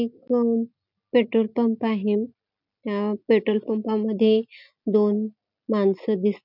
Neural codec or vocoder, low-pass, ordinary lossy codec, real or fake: none; 5.4 kHz; none; real